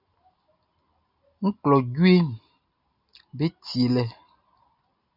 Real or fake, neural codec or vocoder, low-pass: real; none; 5.4 kHz